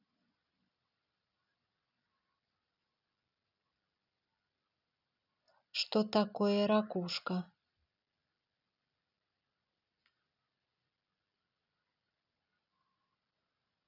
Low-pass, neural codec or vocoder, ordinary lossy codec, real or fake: 5.4 kHz; none; none; real